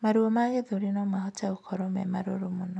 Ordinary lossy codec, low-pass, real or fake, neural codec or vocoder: none; none; real; none